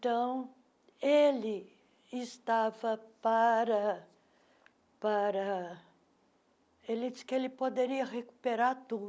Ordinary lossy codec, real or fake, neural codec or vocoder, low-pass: none; real; none; none